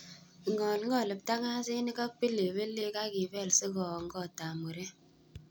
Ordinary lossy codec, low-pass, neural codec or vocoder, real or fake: none; none; none; real